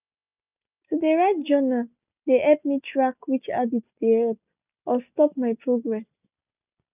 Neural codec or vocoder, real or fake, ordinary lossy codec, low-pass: none; real; none; 3.6 kHz